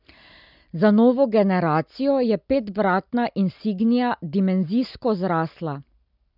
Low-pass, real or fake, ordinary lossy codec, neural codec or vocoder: 5.4 kHz; real; none; none